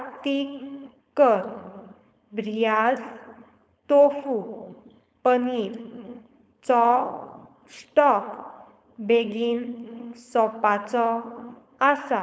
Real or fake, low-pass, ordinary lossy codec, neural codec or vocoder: fake; none; none; codec, 16 kHz, 4.8 kbps, FACodec